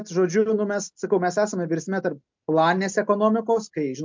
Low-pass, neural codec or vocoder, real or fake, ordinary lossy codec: 7.2 kHz; none; real; MP3, 64 kbps